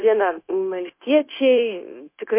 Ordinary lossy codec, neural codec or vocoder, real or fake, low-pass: MP3, 24 kbps; codec, 16 kHz, 0.9 kbps, LongCat-Audio-Codec; fake; 3.6 kHz